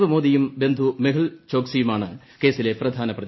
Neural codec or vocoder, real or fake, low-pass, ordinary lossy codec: none; real; 7.2 kHz; MP3, 24 kbps